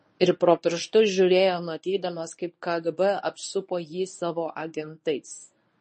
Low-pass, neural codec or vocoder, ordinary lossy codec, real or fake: 10.8 kHz; codec, 24 kHz, 0.9 kbps, WavTokenizer, medium speech release version 1; MP3, 32 kbps; fake